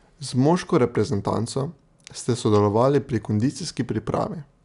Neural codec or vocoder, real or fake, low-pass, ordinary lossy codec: none; real; 10.8 kHz; none